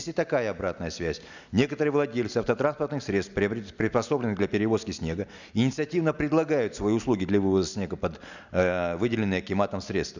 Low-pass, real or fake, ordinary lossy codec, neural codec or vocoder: 7.2 kHz; real; none; none